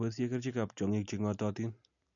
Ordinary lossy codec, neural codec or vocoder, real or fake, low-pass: none; none; real; 7.2 kHz